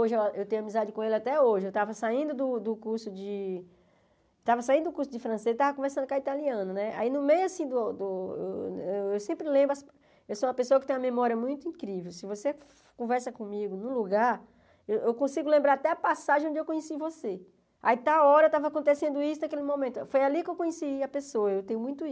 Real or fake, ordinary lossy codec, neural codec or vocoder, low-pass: real; none; none; none